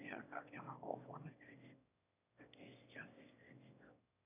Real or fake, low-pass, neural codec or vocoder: fake; 3.6 kHz; autoencoder, 22.05 kHz, a latent of 192 numbers a frame, VITS, trained on one speaker